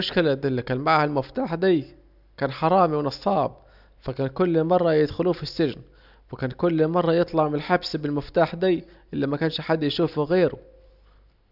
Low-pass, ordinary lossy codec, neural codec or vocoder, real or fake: 5.4 kHz; none; none; real